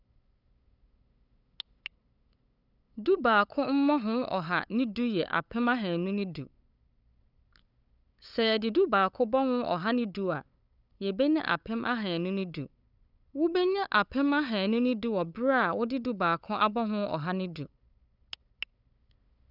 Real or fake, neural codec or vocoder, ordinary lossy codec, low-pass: fake; codec, 16 kHz, 8 kbps, FunCodec, trained on LibriTTS, 25 frames a second; none; 5.4 kHz